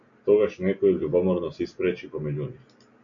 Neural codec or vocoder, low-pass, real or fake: none; 7.2 kHz; real